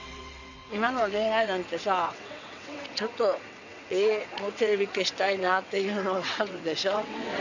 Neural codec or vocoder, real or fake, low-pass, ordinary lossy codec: vocoder, 22.05 kHz, 80 mel bands, WaveNeXt; fake; 7.2 kHz; none